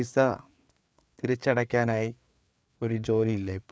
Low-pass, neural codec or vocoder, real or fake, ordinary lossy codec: none; codec, 16 kHz, 4 kbps, FreqCodec, larger model; fake; none